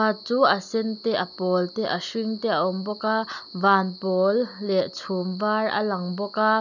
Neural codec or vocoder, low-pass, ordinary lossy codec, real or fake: none; 7.2 kHz; none; real